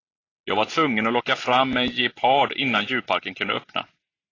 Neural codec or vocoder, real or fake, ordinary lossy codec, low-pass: none; real; AAC, 32 kbps; 7.2 kHz